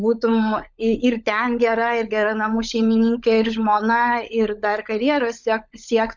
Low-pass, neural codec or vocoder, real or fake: 7.2 kHz; codec, 16 kHz, 16 kbps, FunCodec, trained on LibriTTS, 50 frames a second; fake